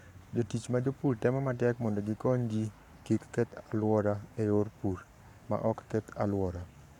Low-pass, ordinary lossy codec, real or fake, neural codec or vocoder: 19.8 kHz; none; fake; codec, 44.1 kHz, 7.8 kbps, Pupu-Codec